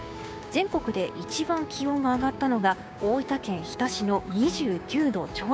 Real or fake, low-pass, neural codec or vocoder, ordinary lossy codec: fake; none; codec, 16 kHz, 6 kbps, DAC; none